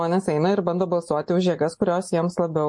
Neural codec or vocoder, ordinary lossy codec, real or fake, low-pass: none; MP3, 48 kbps; real; 10.8 kHz